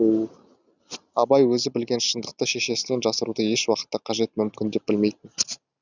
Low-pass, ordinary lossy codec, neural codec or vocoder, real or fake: 7.2 kHz; none; none; real